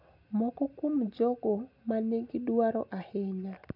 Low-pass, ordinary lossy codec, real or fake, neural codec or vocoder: 5.4 kHz; none; real; none